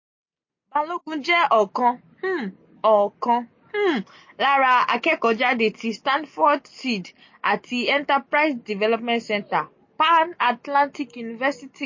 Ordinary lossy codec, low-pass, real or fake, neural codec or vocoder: MP3, 32 kbps; 7.2 kHz; real; none